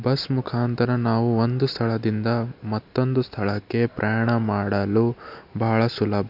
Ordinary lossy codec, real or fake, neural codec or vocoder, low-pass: MP3, 48 kbps; real; none; 5.4 kHz